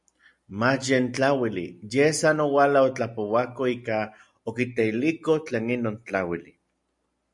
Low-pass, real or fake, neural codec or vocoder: 10.8 kHz; real; none